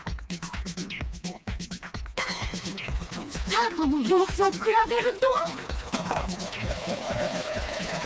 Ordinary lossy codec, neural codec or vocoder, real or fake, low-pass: none; codec, 16 kHz, 2 kbps, FreqCodec, smaller model; fake; none